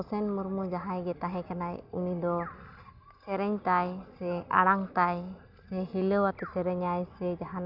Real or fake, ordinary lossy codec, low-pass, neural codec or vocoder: real; none; 5.4 kHz; none